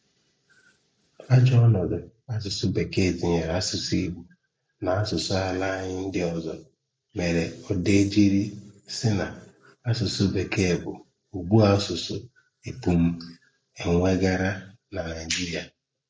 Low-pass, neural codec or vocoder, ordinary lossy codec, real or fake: none; none; none; real